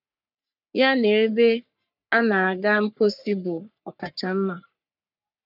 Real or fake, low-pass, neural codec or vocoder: fake; 5.4 kHz; codec, 44.1 kHz, 3.4 kbps, Pupu-Codec